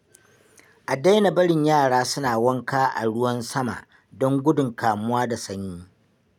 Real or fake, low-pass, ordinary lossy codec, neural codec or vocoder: real; 19.8 kHz; none; none